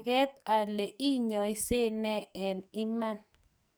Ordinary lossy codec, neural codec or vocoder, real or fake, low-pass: none; codec, 44.1 kHz, 2.6 kbps, SNAC; fake; none